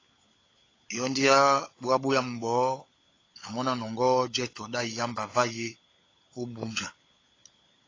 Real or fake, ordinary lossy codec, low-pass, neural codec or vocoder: fake; AAC, 32 kbps; 7.2 kHz; codec, 16 kHz, 16 kbps, FunCodec, trained on LibriTTS, 50 frames a second